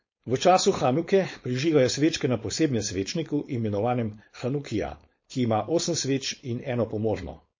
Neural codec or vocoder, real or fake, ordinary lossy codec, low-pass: codec, 16 kHz, 4.8 kbps, FACodec; fake; MP3, 32 kbps; 7.2 kHz